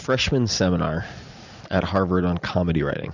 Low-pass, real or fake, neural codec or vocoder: 7.2 kHz; real; none